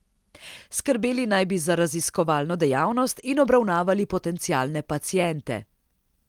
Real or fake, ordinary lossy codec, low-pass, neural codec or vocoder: real; Opus, 24 kbps; 19.8 kHz; none